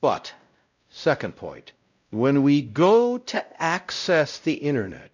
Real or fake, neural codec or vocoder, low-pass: fake; codec, 16 kHz, 0.5 kbps, X-Codec, WavLM features, trained on Multilingual LibriSpeech; 7.2 kHz